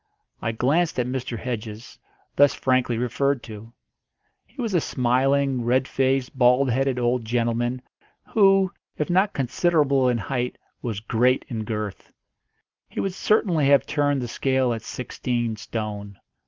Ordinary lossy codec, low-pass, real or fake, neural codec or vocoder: Opus, 24 kbps; 7.2 kHz; real; none